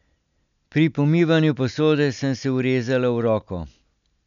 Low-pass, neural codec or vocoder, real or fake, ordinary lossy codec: 7.2 kHz; none; real; none